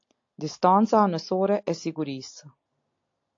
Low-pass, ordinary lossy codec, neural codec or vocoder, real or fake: 7.2 kHz; AAC, 48 kbps; none; real